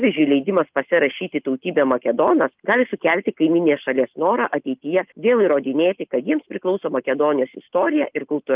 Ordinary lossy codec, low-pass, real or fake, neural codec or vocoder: Opus, 32 kbps; 3.6 kHz; real; none